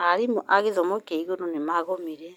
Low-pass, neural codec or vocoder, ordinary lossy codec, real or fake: 19.8 kHz; none; none; real